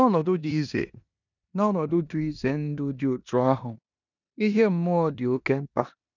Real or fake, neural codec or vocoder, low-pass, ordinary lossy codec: fake; codec, 16 kHz in and 24 kHz out, 0.9 kbps, LongCat-Audio-Codec, four codebook decoder; 7.2 kHz; none